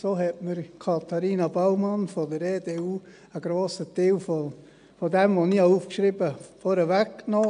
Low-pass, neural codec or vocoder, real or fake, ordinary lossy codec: 9.9 kHz; none; real; AAC, 96 kbps